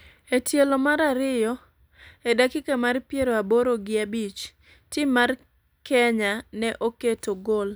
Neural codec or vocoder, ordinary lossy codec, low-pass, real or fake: none; none; none; real